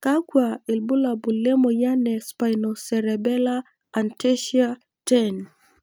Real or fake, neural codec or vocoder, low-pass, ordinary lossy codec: real; none; none; none